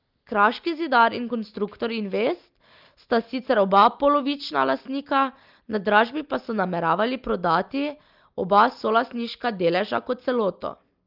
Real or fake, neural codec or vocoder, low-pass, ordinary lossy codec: real; none; 5.4 kHz; Opus, 32 kbps